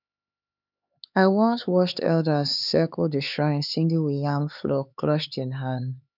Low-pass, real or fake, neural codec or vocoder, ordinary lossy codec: 5.4 kHz; fake; codec, 16 kHz, 4 kbps, X-Codec, HuBERT features, trained on LibriSpeech; none